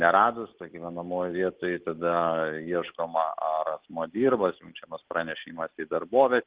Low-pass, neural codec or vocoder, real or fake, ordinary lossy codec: 3.6 kHz; none; real; Opus, 16 kbps